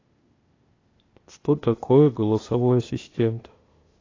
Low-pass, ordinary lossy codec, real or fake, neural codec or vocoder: 7.2 kHz; AAC, 32 kbps; fake; codec, 16 kHz, 0.8 kbps, ZipCodec